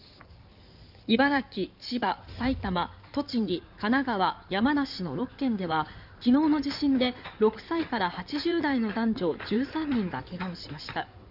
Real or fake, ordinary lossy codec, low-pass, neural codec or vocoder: fake; none; 5.4 kHz; codec, 16 kHz in and 24 kHz out, 2.2 kbps, FireRedTTS-2 codec